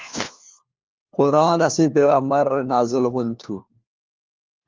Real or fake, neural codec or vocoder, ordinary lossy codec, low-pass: fake; codec, 16 kHz, 1 kbps, FunCodec, trained on LibriTTS, 50 frames a second; Opus, 32 kbps; 7.2 kHz